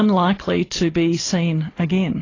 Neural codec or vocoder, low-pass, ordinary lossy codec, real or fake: none; 7.2 kHz; AAC, 32 kbps; real